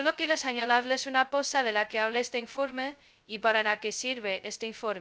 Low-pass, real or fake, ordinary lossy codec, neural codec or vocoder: none; fake; none; codec, 16 kHz, 0.2 kbps, FocalCodec